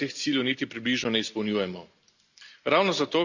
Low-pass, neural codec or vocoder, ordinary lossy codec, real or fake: 7.2 kHz; none; Opus, 64 kbps; real